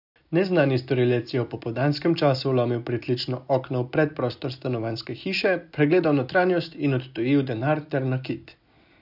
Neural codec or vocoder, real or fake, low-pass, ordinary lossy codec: none; real; 5.4 kHz; none